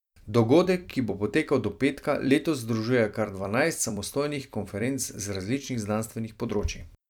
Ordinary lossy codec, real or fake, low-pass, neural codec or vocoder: none; fake; 19.8 kHz; vocoder, 44.1 kHz, 128 mel bands every 256 samples, BigVGAN v2